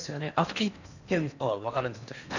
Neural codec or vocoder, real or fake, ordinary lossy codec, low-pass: codec, 16 kHz in and 24 kHz out, 0.8 kbps, FocalCodec, streaming, 65536 codes; fake; none; 7.2 kHz